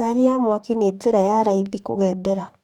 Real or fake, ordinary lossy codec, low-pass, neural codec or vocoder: fake; none; 19.8 kHz; codec, 44.1 kHz, 2.6 kbps, DAC